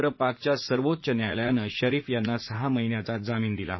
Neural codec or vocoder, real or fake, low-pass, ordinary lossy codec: vocoder, 44.1 kHz, 80 mel bands, Vocos; fake; 7.2 kHz; MP3, 24 kbps